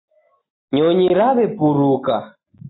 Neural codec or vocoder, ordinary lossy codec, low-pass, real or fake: none; AAC, 16 kbps; 7.2 kHz; real